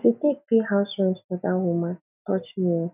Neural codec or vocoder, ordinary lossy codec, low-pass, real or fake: none; none; 3.6 kHz; real